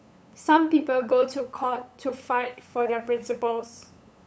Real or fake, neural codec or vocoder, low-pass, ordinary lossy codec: fake; codec, 16 kHz, 8 kbps, FunCodec, trained on LibriTTS, 25 frames a second; none; none